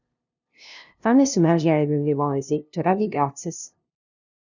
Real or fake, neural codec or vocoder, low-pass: fake; codec, 16 kHz, 0.5 kbps, FunCodec, trained on LibriTTS, 25 frames a second; 7.2 kHz